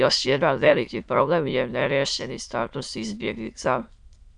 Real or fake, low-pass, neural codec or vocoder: fake; 9.9 kHz; autoencoder, 22.05 kHz, a latent of 192 numbers a frame, VITS, trained on many speakers